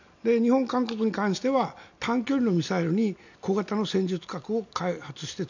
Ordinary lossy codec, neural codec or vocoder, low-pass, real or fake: none; none; 7.2 kHz; real